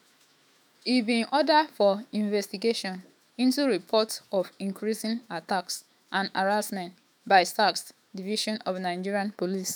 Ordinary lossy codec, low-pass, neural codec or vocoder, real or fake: none; none; autoencoder, 48 kHz, 128 numbers a frame, DAC-VAE, trained on Japanese speech; fake